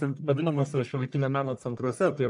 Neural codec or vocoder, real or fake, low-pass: codec, 44.1 kHz, 1.7 kbps, Pupu-Codec; fake; 10.8 kHz